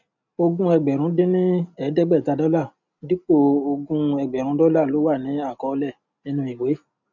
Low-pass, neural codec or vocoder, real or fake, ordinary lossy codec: 7.2 kHz; none; real; none